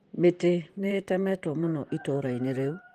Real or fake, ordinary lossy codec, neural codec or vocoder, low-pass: fake; Opus, 24 kbps; vocoder, 44.1 kHz, 128 mel bands, Pupu-Vocoder; 14.4 kHz